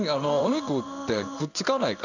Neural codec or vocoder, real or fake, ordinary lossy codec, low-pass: codec, 16 kHz in and 24 kHz out, 1 kbps, XY-Tokenizer; fake; none; 7.2 kHz